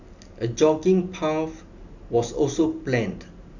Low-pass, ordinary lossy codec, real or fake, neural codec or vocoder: 7.2 kHz; none; real; none